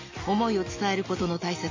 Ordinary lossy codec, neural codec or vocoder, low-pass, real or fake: MP3, 32 kbps; none; 7.2 kHz; real